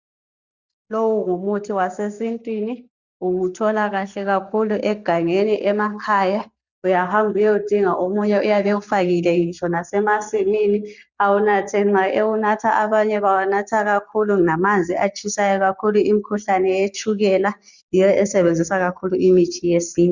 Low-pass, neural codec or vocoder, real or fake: 7.2 kHz; none; real